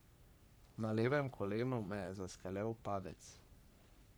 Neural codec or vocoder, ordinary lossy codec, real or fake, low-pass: codec, 44.1 kHz, 3.4 kbps, Pupu-Codec; none; fake; none